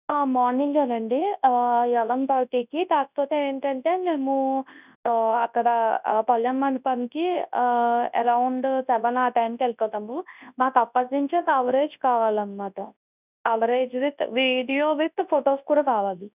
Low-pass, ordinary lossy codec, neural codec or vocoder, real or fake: 3.6 kHz; none; codec, 24 kHz, 0.9 kbps, WavTokenizer, large speech release; fake